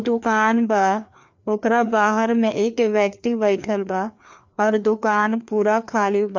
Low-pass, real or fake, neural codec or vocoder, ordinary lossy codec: 7.2 kHz; fake; codec, 16 kHz, 2 kbps, FreqCodec, larger model; MP3, 64 kbps